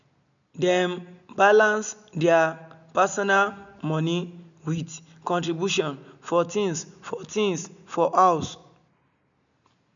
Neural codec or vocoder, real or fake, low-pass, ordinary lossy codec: none; real; 7.2 kHz; none